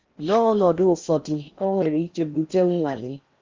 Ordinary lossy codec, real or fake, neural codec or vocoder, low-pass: Opus, 32 kbps; fake; codec, 16 kHz in and 24 kHz out, 0.6 kbps, FocalCodec, streaming, 4096 codes; 7.2 kHz